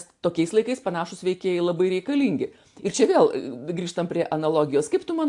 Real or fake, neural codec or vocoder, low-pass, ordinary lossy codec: real; none; 10.8 kHz; AAC, 64 kbps